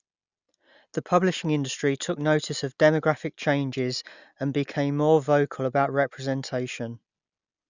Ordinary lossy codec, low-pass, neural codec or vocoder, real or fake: none; 7.2 kHz; none; real